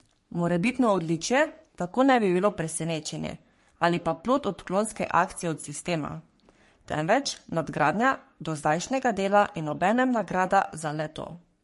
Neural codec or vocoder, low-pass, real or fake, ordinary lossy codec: codec, 44.1 kHz, 3.4 kbps, Pupu-Codec; 14.4 kHz; fake; MP3, 48 kbps